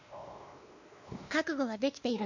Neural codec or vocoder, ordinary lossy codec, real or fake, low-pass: codec, 16 kHz, 2 kbps, X-Codec, WavLM features, trained on Multilingual LibriSpeech; none; fake; 7.2 kHz